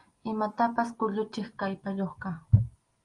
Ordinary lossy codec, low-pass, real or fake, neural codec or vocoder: Opus, 32 kbps; 10.8 kHz; real; none